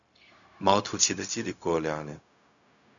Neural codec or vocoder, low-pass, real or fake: codec, 16 kHz, 0.4 kbps, LongCat-Audio-Codec; 7.2 kHz; fake